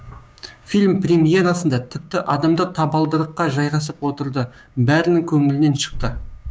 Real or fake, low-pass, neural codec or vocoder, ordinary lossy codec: fake; none; codec, 16 kHz, 6 kbps, DAC; none